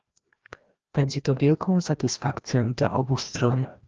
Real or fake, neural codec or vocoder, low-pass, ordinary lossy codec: fake; codec, 16 kHz, 1 kbps, FreqCodec, larger model; 7.2 kHz; Opus, 24 kbps